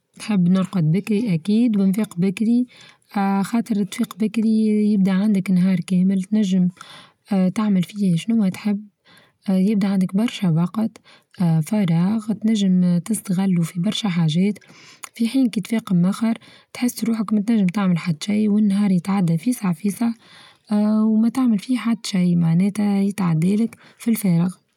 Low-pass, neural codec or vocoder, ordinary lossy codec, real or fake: 19.8 kHz; none; none; real